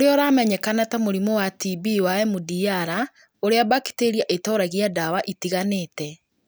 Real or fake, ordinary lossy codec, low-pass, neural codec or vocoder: real; none; none; none